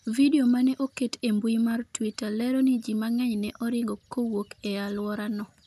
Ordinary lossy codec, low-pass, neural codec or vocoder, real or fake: none; 14.4 kHz; none; real